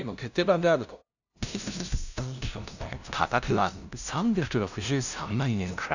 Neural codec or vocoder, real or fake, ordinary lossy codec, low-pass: codec, 16 kHz, 0.5 kbps, FunCodec, trained on LibriTTS, 25 frames a second; fake; none; 7.2 kHz